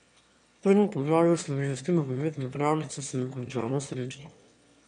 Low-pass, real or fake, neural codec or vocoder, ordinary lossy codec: 9.9 kHz; fake; autoencoder, 22.05 kHz, a latent of 192 numbers a frame, VITS, trained on one speaker; none